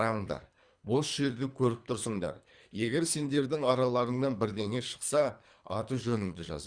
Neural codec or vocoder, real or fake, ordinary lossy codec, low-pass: codec, 24 kHz, 3 kbps, HILCodec; fake; Opus, 64 kbps; 9.9 kHz